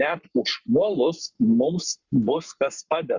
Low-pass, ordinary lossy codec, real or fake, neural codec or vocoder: 7.2 kHz; Opus, 64 kbps; fake; codec, 44.1 kHz, 7.8 kbps, Pupu-Codec